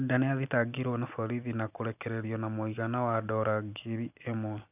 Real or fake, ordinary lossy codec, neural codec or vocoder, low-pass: real; none; none; 3.6 kHz